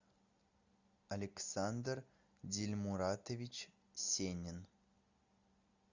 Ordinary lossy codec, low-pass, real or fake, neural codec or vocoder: Opus, 64 kbps; 7.2 kHz; real; none